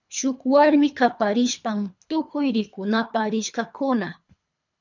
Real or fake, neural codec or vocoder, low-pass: fake; codec, 24 kHz, 3 kbps, HILCodec; 7.2 kHz